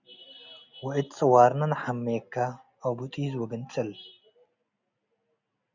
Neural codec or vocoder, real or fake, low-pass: none; real; 7.2 kHz